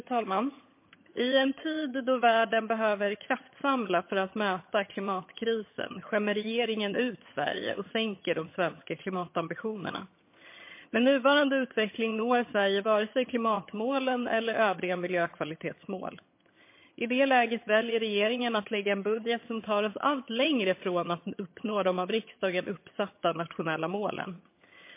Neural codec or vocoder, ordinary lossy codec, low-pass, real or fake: vocoder, 22.05 kHz, 80 mel bands, HiFi-GAN; MP3, 24 kbps; 3.6 kHz; fake